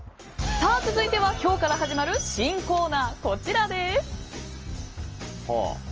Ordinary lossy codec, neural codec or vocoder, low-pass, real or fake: Opus, 24 kbps; none; 7.2 kHz; real